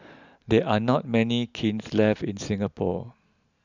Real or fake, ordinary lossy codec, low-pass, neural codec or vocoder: real; none; 7.2 kHz; none